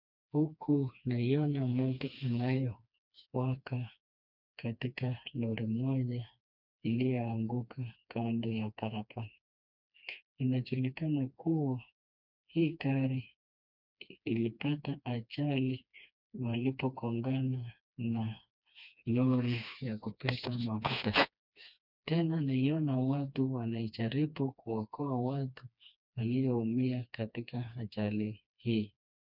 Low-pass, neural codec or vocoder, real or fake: 5.4 kHz; codec, 16 kHz, 2 kbps, FreqCodec, smaller model; fake